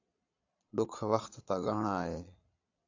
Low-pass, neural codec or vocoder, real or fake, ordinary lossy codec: 7.2 kHz; vocoder, 22.05 kHz, 80 mel bands, Vocos; fake; AAC, 48 kbps